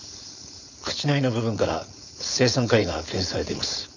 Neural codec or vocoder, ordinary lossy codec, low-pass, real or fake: codec, 16 kHz, 4.8 kbps, FACodec; none; 7.2 kHz; fake